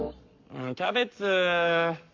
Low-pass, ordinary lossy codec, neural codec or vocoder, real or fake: 7.2 kHz; MP3, 64 kbps; codec, 44.1 kHz, 7.8 kbps, Pupu-Codec; fake